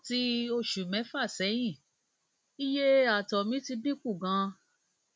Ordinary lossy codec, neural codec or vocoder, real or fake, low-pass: none; none; real; none